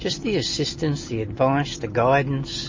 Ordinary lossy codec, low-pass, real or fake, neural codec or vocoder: MP3, 32 kbps; 7.2 kHz; real; none